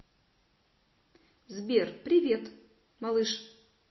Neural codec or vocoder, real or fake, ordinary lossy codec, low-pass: none; real; MP3, 24 kbps; 7.2 kHz